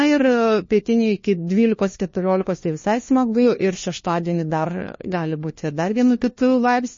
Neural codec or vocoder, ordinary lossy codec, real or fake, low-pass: codec, 16 kHz, 1 kbps, FunCodec, trained on LibriTTS, 50 frames a second; MP3, 32 kbps; fake; 7.2 kHz